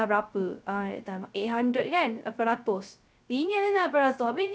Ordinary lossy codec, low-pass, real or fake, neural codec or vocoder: none; none; fake; codec, 16 kHz, 0.3 kbps, FocalCodec